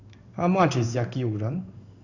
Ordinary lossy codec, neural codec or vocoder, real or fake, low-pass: none; codec, 16 kHz in and 24 kHz out, 1 kbps, XY-Tokenizer; fake; 7.2 kHz